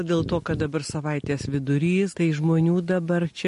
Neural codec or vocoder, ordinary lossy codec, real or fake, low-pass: none; MP3, 48 kbps; real; 10.8 kHz